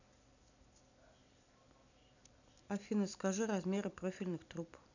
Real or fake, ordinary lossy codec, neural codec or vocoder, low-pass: fake; none; vocoder, 44.1 kHz, 128 mel bands every 256 samples, BigVGAN v2; 7.2 kHz